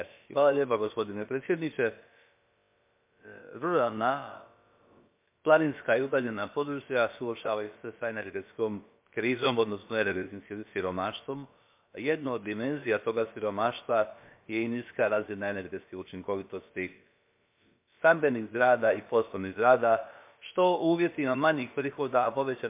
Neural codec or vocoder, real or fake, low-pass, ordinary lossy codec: codec, 16 kHz, about 1 kbps, DyCAST, with the encoder's durations; fake; 3.6 kHz; MP3, 32 kbps